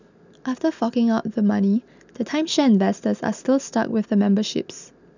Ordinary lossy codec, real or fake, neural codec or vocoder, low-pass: none; real; none; 7.2 kHz